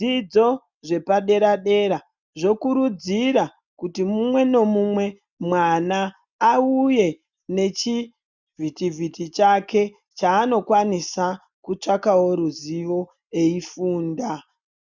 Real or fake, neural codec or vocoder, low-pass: real; none; 7.2 kHz